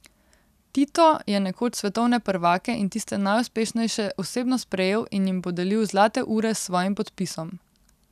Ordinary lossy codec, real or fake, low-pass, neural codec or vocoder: none; real; 14.4 kHz; none